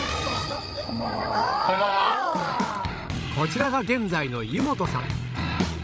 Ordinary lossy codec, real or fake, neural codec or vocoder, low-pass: none; fake; codec, 16 kHz, 8 kbps, FreqCodec, larger model; none